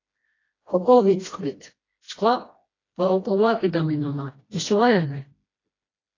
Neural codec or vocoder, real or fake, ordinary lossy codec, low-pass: codec, 16 kHz, 1 kbps, FreqCodec, smaller model; fake; AAC, 32 kbps; 7.2 kHz